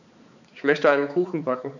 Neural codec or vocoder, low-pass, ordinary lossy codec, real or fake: codec, 16 kHz, 4 kbps, X-Codec, HuBERT features, trained on balanced general audio; 7.2 kHz; none; fake